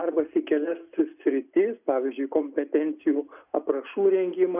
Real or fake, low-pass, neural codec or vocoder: real; 3.6 kHz; none